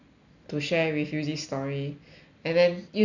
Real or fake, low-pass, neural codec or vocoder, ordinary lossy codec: real; 7.2 kHz; none; none